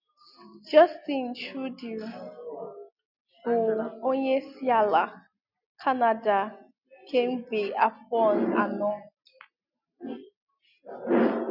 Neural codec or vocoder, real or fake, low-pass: none; real; 5.4 kHz